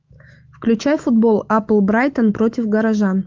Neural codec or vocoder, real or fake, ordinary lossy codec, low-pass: autoencoder, 48 kHz, 128 numbers a frame, DAC-VAE, trained on Japanese speech; fake; Opus, 32 kbps; 7.2 kHz